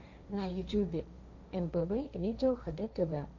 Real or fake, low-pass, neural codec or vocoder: fake; 7.2 kHz; codec, 16 kHz, 1.1 kbps, Voila-Tokenizer